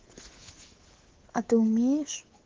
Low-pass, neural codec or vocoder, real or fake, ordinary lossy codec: 7.2 kHz; codec, 24 kHz, 3.1 kbps, DualCodec; fake; Opus, 16 kbps